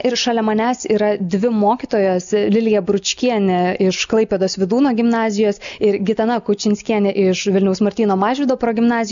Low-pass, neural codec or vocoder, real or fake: 7.2 kHz; none; real